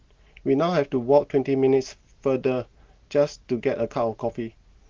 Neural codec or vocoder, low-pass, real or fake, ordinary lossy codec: none; 7.2 kHz; real; Opus, 16 kbps